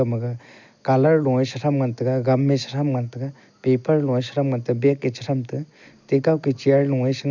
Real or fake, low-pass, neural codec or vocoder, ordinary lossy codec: real; 7.2 kHz; none; none